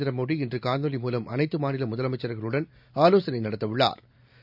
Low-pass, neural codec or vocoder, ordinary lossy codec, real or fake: 5.4 kHz; none; none; real